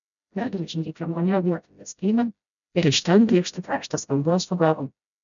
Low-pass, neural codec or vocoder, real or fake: 7.2 kHz; codec, 16 kHz, 0.5 kbps, FreqCodec, smaller model; fake